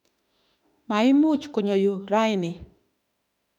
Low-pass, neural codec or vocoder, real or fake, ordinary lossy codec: 19.8 kHz; autoencoder, 48 kHz, 32 numbers a frame, DAC-VAE, trained on Japanese speech; fake; none